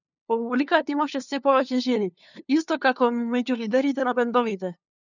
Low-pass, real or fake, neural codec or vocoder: 7.2 kHz; fake; codec, 16 kHz, 2 kbps, FunCodec, trained on LibriTTS, 25 frames a second